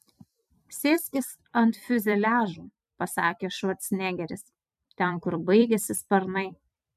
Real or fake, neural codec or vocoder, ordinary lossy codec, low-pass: fake; vocoder, 44.1 kHz, 128 mel bands every 256 samples, BigVGAN v2; MP3, 96 kbps; 14.4 kHz